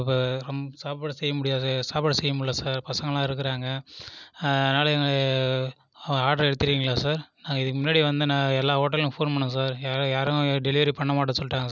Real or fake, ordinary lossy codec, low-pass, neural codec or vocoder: real; none; 7.2 kHz; none